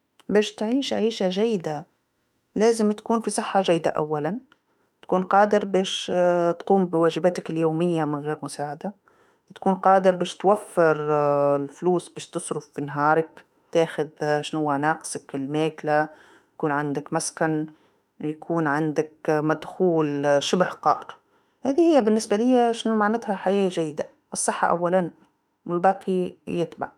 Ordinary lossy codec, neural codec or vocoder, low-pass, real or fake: none; autoencoder, 48 kHz, 32 numbers a frame, DAC-VAE, trained on Japanese speech; 19.8 kHz; fake